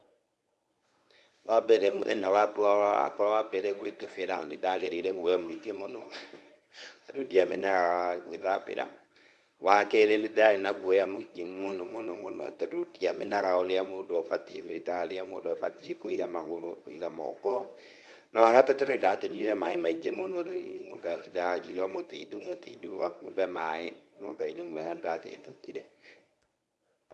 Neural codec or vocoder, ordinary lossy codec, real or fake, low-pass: codec, 24 kHz, 0.9 kbps, WavTokenizer, medium speech release version 1; none; fake; none